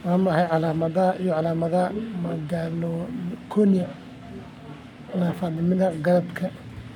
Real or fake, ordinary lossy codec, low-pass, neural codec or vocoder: fake; none; 19.8 kHz; codec, 44.1 kHz, 7.8 kbps, Pupu-Codec